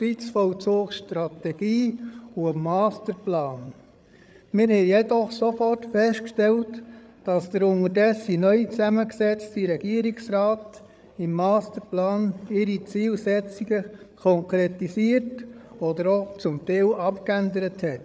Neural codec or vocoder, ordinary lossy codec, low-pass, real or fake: codec, 16 kHz, 8 kbps, FreqCodec, larger model; none; none; fake